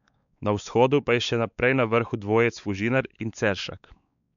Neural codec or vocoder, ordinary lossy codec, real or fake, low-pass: codec, 16 kHz, 4 kbps, X-Codec, WavLM features, trained on Multilingual LibriSpeech; none; fake; 7.2 kHz